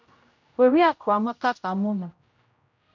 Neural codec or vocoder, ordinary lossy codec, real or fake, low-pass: codec, 16 kHz, 0.5 kbps, X-Codec, HuBERT features, trained on general audio; MP3, 48 kbps; fake; 7.2 kHz